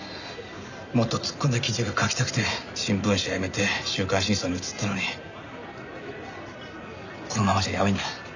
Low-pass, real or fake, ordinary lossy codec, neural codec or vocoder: 7.2 kHz; real; none; none